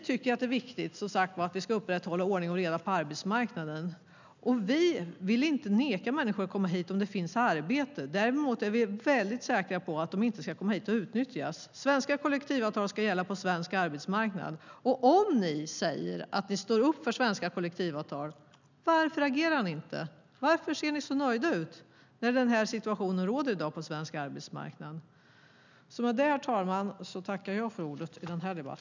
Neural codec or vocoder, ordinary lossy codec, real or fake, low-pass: none; none; real; 7.2 kHz